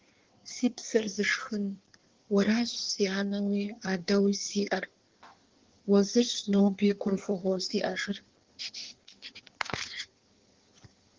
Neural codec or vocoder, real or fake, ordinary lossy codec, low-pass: codec, 16 kHz in and 24 kHz out, 1.1 kbps, FireRedTTS-2 codec; fake; Opus, 16 kbps; 7.2 kHz